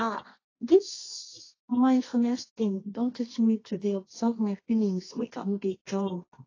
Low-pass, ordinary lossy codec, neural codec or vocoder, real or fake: 7.2 kHz; AAC, 32 kbps; codec, 24 kHz, 0.9 kbps, WavTokenizer, medium music audio release; fake